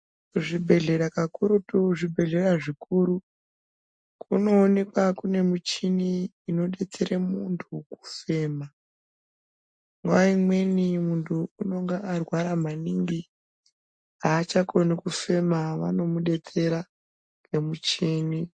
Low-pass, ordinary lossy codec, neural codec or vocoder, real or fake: 9.9 kHz; MP3, 48 kbps; none; real